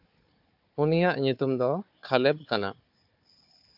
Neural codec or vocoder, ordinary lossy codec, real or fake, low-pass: codec, 16 kHz, 4 kbps, FunCodec, trained on Chinese and English, 50 frames a second; AAC, 48 kbps; fake; 5.4 kHz